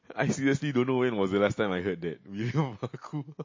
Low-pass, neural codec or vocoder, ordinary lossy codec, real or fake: 7.2 kHz; none; MP3, 32 kbps; real